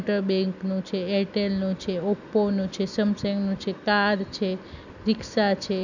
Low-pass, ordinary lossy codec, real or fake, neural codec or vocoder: 7.2 kHz; none; real; none